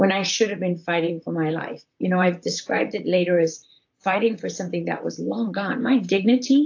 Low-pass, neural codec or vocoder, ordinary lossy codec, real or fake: 7.2 kHz; vocoder, 44.1 kHz, 128 mel bands, Pupu-Vocoder; AAC, 48 kbps; fake